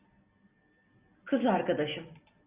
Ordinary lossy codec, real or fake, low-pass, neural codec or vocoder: Opus, 64 kbps; real; 3.6 kHz; none